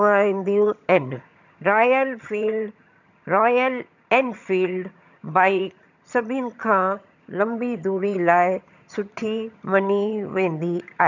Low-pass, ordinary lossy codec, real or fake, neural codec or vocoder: 7.2 kHz; none; fake; vocoder, 22.05 kHz, 80 mel bands, HiFi-GAN